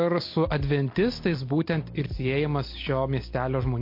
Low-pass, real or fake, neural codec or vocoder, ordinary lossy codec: 5.4 kHz; real; none; MP3, 32 kbps